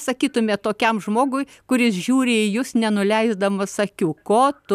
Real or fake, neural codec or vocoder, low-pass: real; none; 14.4 kHz